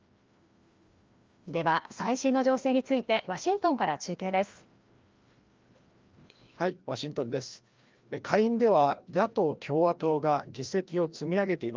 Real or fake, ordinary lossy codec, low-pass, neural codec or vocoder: fake; Opus, 32 kbps; 7.2 kHz; codec, 16 kHz, 1 kbps, FreqCodec, larger model